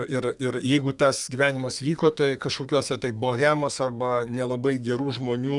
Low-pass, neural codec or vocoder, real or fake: 10.8 kHz; codec, 32 kHz, 1.9 kbps, SNAC; fake